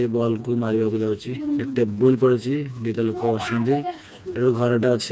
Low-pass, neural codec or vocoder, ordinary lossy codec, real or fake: none; codec, 16 kHz, 2 kbps, FreqCodec, smaller model; none; fake